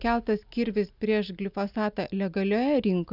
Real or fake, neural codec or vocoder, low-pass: real; none; 5.4 kHz